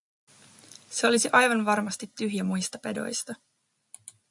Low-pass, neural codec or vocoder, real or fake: 10.8 kHz; none; real